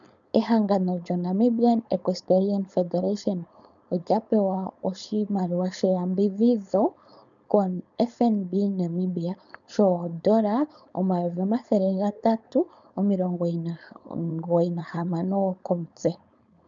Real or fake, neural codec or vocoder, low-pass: fake; codec, 16 kHz, 4.8 kbps, FACodec; 7.2 kHz